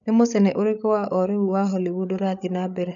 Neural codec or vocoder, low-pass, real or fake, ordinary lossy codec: codec, 16 kHz, 8 kbps, FreqCodec, larger model; 7.2 kHz; fake; none